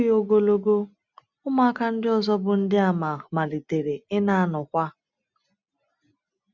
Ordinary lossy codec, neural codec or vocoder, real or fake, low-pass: none; none; real; 7.2 kHz